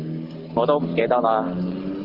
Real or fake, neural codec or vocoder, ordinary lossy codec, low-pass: real; none; Opus, 16 kbps; 5.4 kHz